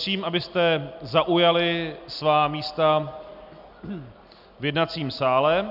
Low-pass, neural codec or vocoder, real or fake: 5.4 kHz; none; real